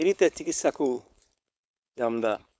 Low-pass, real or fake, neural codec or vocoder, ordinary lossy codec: none; fake; codec, 16 kHz, 4.8 kbps, FACodec; none